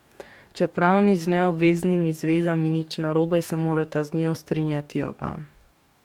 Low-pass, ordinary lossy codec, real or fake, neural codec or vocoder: 19.8 kHz; MP3, 96 kbps; fake; codec, 44.1 kHz, 2.6 kbps, DAC